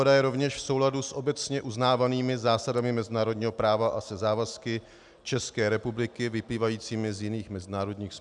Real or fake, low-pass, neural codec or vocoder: real; 10.8 kHz; none